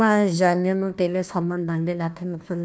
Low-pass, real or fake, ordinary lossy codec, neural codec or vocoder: none; fake; none; codec, 16 kHz, 1 kbps, FunCodec, trained on Chinese and English, 50 frames a second